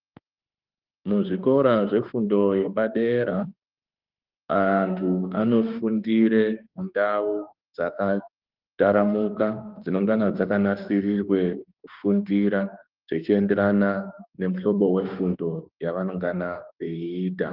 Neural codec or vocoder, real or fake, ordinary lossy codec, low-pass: autoencoder, 48 kHz, 32 numbers a frame, DAC-VAE, trained on Japanese speech; fake; Opus, 32 kbps; 5.4 kHz